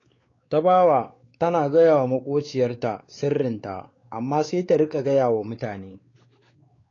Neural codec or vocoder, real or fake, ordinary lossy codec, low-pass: codec, 16 kHz, 4 kbps, X-Codec, WavLM features, trained on Multilingual LibriSpeech; fake; AAC, 32 kbps; 7.2 kHz